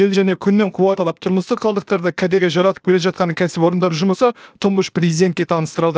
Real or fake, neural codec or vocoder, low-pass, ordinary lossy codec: fake; codec, 16 kHz, 0.8 kbps, ZipCodec; none; none